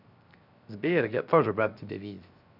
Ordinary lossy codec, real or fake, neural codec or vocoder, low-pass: none; fake; codec, 16 kHz, 0.7 kbps, FocalCodec; 5.4 kHz